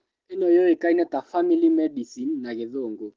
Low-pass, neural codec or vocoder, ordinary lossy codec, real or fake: 7.2 kHz; none; Opus, 16 kbps; real